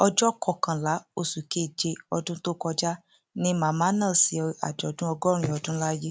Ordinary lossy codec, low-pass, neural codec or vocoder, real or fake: none; none; none; real